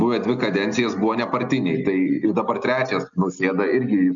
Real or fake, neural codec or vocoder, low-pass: real; none; 7.2 kHz